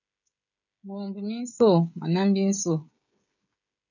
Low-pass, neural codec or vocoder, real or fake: 7.2 kHz; codec, 16 kHz, 16 kbps, FreqCodec, smaller model; fake